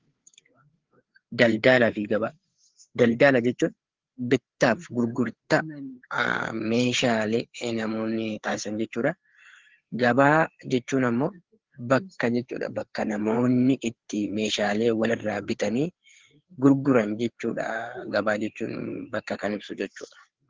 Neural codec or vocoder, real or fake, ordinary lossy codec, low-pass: codec, 16 kHz, 4 kbps, FreqCodec, larger model; fake; Opus, 16 kbps; 7.2 kHz